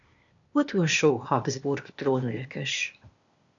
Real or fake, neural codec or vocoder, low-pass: fake; codec, 16 kHz, 0.8 kbps, ZipCodec; 7.2 kHz